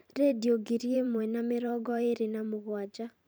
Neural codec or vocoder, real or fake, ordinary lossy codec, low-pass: vocoder, 44.1 kHz, 128 mel bands every 512 samples, BigVGAN v2; fake; none; none